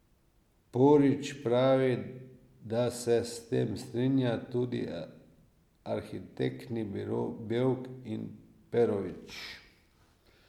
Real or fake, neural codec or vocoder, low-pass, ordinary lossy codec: real; none; 19.8 kHz; MP3, 96 kbps